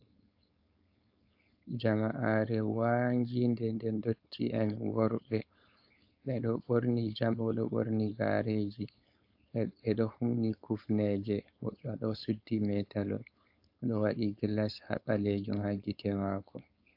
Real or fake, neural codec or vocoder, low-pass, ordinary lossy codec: fake; codec, 16 kHz, 4.8 kbps, FACodec; 5.4 kHz; AAC, 48 kbps